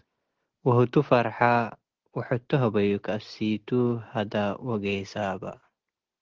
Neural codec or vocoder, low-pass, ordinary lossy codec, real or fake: none; 7.2 kHz; Opus, 16 kbps; real